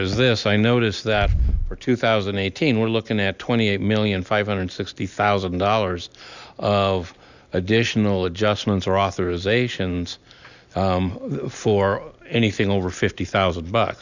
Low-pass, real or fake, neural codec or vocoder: 7.2 kHz; real; none